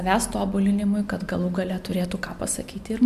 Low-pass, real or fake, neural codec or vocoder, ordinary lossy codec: 14.4 kHz; real; none; MP3, 96 kbps